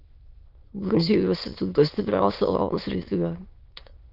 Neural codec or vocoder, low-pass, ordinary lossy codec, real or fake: autoencoder, 22.05 kHz, a latent of 192 numbers a frame, VITS, trained on many speakers; 5.4 kHz; Opus, 32 kbps; fake